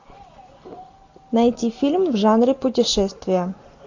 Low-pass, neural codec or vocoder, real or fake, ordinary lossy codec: 7.2 kHz; none; real; AAC, 48 kbps